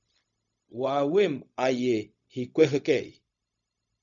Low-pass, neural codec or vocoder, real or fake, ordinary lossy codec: 7.2 kHz; codec, 16 kHz, 0.4 kbps, LongCat-Audio-Codec; fake; MP3, 96 kbps